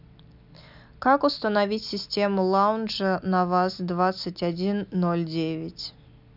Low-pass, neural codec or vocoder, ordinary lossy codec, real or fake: 5.4 kHz; none; none; real